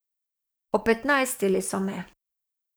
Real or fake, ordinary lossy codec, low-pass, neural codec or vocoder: fake; none; none; codec, 44.1 kHz, 7.8 kbps, DAC